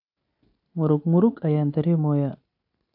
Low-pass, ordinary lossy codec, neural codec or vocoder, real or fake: 5.4 kHz; AAC, 48 kbps; none; real